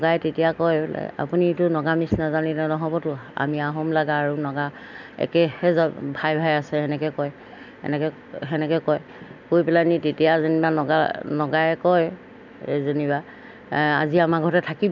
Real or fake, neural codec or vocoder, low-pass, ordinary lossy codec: real; none; 7.2 kHz; none